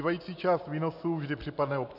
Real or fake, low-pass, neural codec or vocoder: real; 5.4 kHz; none